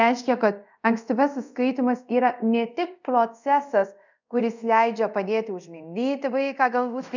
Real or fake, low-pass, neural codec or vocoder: fake; 7.2 kHz; codec, 24 kHz, 0.5 kbps, DualCodec